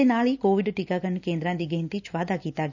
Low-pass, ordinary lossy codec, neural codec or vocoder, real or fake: 7.2 kHz; none; none; real